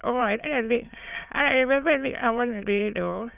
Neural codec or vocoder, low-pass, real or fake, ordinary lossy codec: autoencoder, 22.05 kHz, a latent of 192 numbers a frame, VITS, trained on many speakers; 3.6 kHz; fake; none